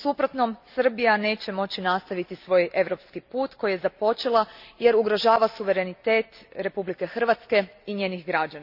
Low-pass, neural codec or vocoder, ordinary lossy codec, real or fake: 5.4 kHz; none; none; real